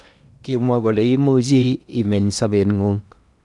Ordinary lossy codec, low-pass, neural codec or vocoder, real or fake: none; 10.8 kHz; codec, 16 kHz in and 24 kHz out, 0.8 kbps, FocalCodec, streaming, 65536 codes; fake